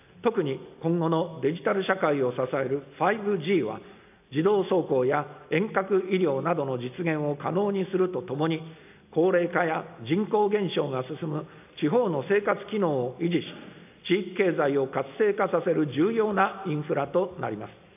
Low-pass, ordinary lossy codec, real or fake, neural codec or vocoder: 3.6 kHz; AAC, 32 kbps; real; none